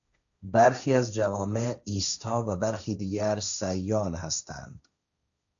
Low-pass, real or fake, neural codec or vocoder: 7.2 kHz; fake; codec, 16 kHz, 1.1 kbps, Voila-Tokenizer